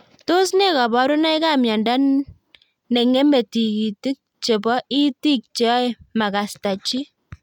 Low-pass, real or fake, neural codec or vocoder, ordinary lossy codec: 19.8 kHz; real; none; none